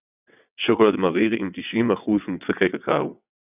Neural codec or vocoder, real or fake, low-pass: vocoder, 22.05 kHz, 80 mel bands, WaveNeXt; fake; 3.6 kHz